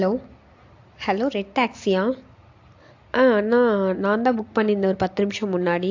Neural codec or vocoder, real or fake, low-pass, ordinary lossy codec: none; real; 7.2 kHz; AAC, 48 kbps